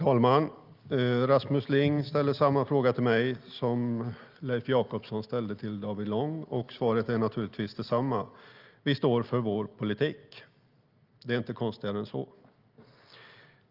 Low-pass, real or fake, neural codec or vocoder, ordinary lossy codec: 5.4 kHz; real; none; Opus, 24 kbps